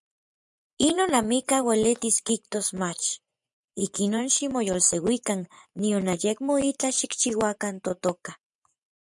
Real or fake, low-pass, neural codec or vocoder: fake; 10.8 kHz; vocoder, 24 kHz, 100 mel bands, Vocos